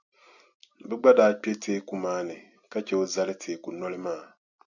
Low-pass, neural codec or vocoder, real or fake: 7.2 kHz; none; real